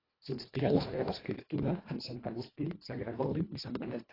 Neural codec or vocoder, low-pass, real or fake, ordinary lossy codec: codec, 24 kHz, 1.5 kbps, HILCodec; 5.4 kHz; fake; AAC, 24 kbps